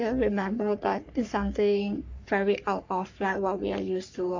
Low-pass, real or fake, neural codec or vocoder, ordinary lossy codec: 7.2 kHz; fake; codec, 44.1 kHz, 3.4 kbps, Pupu-Codec; AAC, 48 kbps